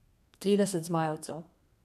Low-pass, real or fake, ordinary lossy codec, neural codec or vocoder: 14.4 kHz; fake; none; codec, 32 kHz, 1.9 kbps, SNAC